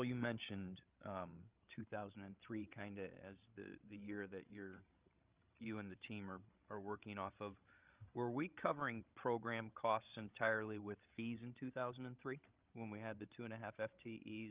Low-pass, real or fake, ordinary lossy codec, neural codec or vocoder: 3.6 kHz; fake; Opus, 24 kbps; codec, 16 kHz, 16 kbps, FreqCodec, larger model